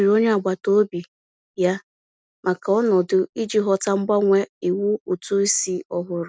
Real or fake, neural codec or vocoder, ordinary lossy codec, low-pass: real; none; none; none